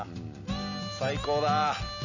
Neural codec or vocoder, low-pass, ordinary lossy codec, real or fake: none; 7.2 kHz; none; real